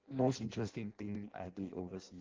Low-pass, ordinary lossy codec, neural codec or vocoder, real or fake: 7.2 kHz; Opus, 16 kbps; codec, 16 kHz in and 24 kHz out, 0.6 kbps, FireRedTTS-2 codec; fake